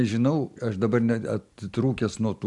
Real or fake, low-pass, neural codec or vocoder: real; 10.8 kHz; none